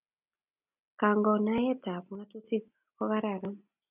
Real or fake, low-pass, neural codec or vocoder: real; 3.6 kHz; none